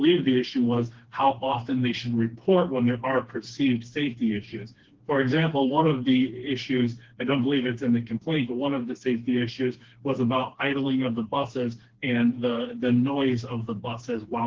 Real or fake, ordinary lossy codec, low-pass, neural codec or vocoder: fake; Opus, 16 kbps; 7.2 kHz; codec, 16 kHz, 2 kbps, FreqCodec, smaller model